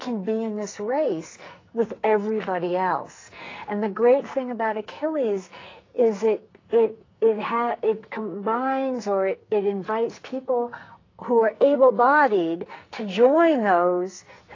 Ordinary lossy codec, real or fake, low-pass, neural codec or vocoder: AAC, 32 kbps; fake; 7.2 kHz; codec, 44.1 kHz, 2.6 kbps, SNAC